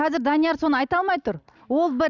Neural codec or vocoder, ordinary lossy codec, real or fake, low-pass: none; none; real; 7.2 kHz